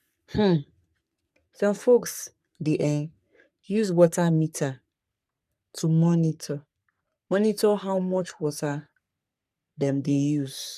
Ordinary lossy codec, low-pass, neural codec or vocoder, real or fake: none; 14.4 kHz; codec, 44.1 kHz, 3.4 kbps, Pupu-Codec; fake